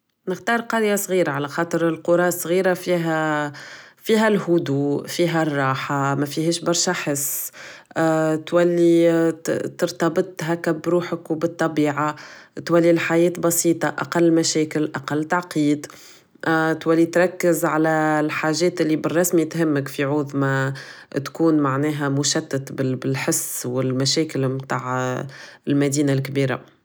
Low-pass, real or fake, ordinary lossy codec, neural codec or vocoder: none; real; none; none